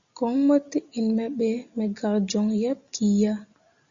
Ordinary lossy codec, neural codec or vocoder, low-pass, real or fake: Opus, 64 kbps; none; 7.2 kHz; real